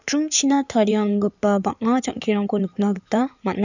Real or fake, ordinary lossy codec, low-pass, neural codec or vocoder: fake; none; 7.2 kHz; codec, 16 kHz in and 24 kHz out, 2.2 kbps, FireRedTTS-2 codec